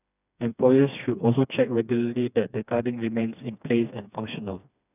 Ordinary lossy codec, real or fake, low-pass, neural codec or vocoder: none; fake; 3.6 kHz; codec, 16 kHz, 2 kbps, FreqCodec, smaller model